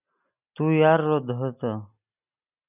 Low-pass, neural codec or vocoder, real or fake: 3.6 kHz; none; real